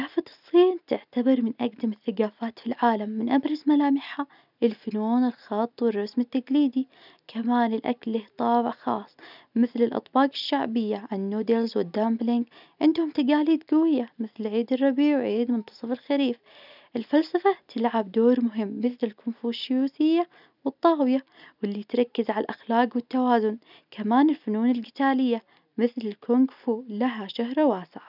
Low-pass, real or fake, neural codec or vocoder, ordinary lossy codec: 5.4 kHz; real; none; none